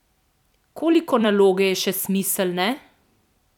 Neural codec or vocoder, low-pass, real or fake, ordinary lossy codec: vocoder, 44.1 kHz, 128 mel bands every 256 samples, BigVGAN v2; 19.8 kHz; fake; none